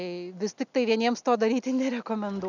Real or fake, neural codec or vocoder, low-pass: real; none; 7.2 kHz